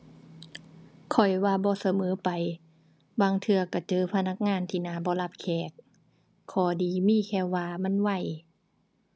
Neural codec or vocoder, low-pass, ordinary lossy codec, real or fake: none; none; none; real